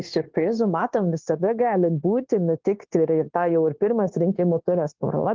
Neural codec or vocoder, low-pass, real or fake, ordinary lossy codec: codec, 16 kHz, 0.9 kbps, LongCat-Audio-Codec; 7.2 kHz; fake; Opus, 16 kbps